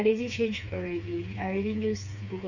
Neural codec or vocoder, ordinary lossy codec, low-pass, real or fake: codec, 16 kHz, 4 kbps, FreqCodec, smaller model; none; 7.2 kHz; fake